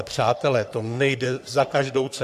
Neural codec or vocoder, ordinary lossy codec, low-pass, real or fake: codec, 44.1 kHz, 3.4 kbps, Pupu-Codec; AAC, 96 kbps; 14.4 kHz; fake